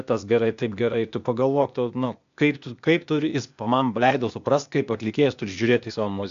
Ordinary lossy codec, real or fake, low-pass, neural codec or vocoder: MP3, 64 kbps; fake; 7.2 kHz; codec, 16 kHz, 0.8 kbps, ZipCodec